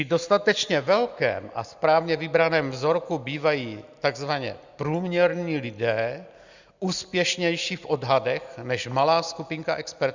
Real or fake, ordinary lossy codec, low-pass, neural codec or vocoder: real; Opus, 64 kbps; 7.2 kHz; none